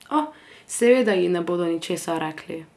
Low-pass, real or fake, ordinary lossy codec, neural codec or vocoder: none; real; none; none